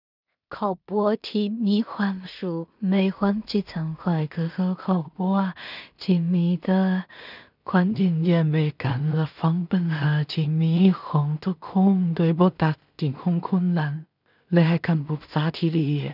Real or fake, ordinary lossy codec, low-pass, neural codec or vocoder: fake; none; 5.4 kHz; codec, 16 kHz in and 24 kHz out, 0.4 kbps, LongCat-Audio-Codec, two codebook decoder